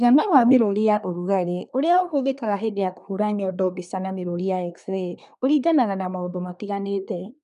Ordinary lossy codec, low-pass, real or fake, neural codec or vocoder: none; 10.8 kHz; fake; codec, 24 kHz, 1 kbps, SNAC